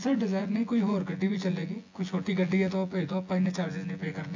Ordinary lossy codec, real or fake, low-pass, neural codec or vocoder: AAC, 48 kbps; fake; 7.2 kHz; vocoder, 24 kHz, 100 mel bands, Vocos